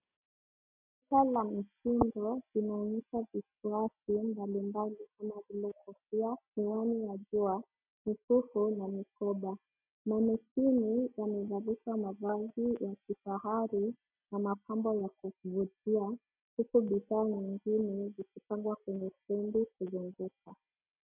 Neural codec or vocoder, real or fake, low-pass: none; real; 3.6 kHz